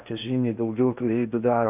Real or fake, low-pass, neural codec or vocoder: fake; 3.6 kHz; codec, 16 kHz in and 24 kHz out, 0.8 kbps, FocalCodec, streaming, 65536 codes